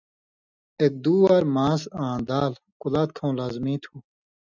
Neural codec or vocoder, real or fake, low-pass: none; real; 7.2 kHz